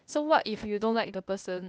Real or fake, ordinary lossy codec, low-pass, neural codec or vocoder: fake; none; none; codec, 16 kHz, 0.8 kbps, ZipCodec